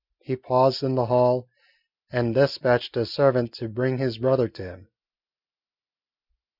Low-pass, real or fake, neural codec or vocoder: 5.4 kHz; real; none